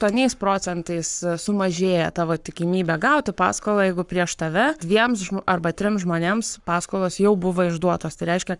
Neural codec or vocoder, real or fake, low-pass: codec, 44.1 kHz, 7.8 kbps, Pupu-Codec; fake; 10.8 kHz